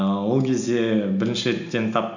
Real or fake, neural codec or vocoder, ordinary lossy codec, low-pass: real; none; none; 7.2 kHz